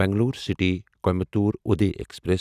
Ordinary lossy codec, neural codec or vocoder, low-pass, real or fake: none; none; 14.4 kHz; real